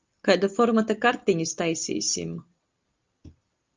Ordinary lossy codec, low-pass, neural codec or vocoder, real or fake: Opus, 24 kbps; 7.2 kHz; none; real